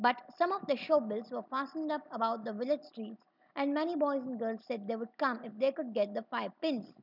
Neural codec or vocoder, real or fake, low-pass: none; real; 5.4 kHz